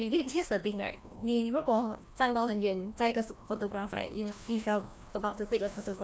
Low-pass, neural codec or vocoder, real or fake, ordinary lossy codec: none; codec, 16 kHz, 1 kbps, FreqCodec, larger model; fake; none